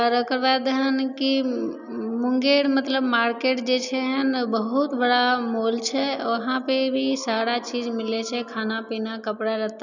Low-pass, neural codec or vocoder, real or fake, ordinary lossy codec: 7.2 kHz; none; real; none